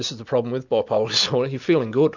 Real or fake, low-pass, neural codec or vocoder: real; 7.2 kHz; none